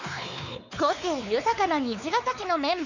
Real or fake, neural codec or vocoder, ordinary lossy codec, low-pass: fake; codec, 16 kHz, 4 kbps, X-Codec, WavLM features, trained on Multilingual LibriSpeech; none; 7.2 kHz